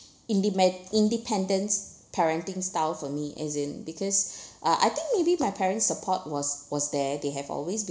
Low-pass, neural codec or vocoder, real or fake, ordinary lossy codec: none; none; real; none